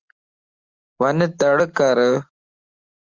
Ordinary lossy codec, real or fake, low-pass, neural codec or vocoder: Opus, 32 kbps; real; 7.2 kHz; none